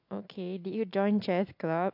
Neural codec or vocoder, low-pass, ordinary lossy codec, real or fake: none; 5.4 kHz; AAC, 48 kbps; real